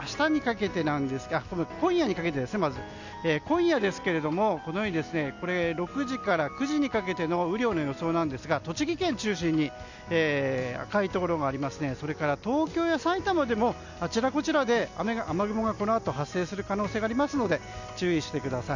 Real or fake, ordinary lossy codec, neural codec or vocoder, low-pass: real; none; none; 7.2 kHz